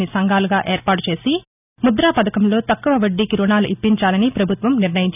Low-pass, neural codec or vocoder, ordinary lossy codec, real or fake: 3.6 kHz; none; none; real